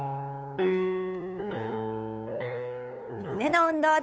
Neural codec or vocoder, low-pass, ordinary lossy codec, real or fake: codec, 16 kHz, 8 kbps, FunCodec, trained on LibriTTS, 25 frames a second; none; none; fake